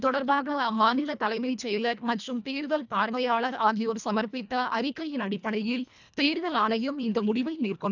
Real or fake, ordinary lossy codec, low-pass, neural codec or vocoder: fake; none; 7.2 kHz; codec, 24 kHz, 1.5 kbps, HILCodec